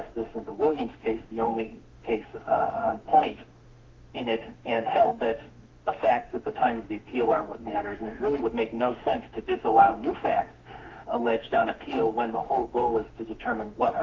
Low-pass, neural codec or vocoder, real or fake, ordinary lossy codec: 7.2 kHz; autoencoder, 48 kHz, 32 numbers a frame, DAC-VAE, trained on Japanese speech; fake; Opus, 32 kbps